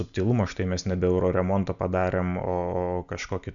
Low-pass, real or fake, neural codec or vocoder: 7.2 kHz; real; none